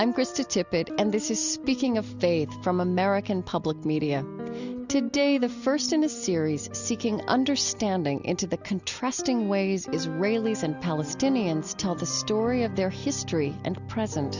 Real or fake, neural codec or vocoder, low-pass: real; none; 7.2 kHz